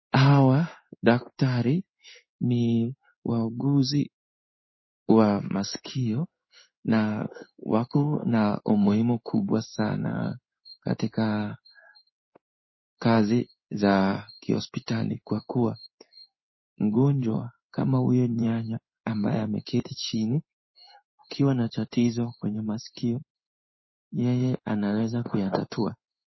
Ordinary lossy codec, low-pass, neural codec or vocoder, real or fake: MP3, 24 kbps; 7.2 kHz; codec, 16 kHz in and 24 kHz out, 1 kbps, XY-Tokenizer; fake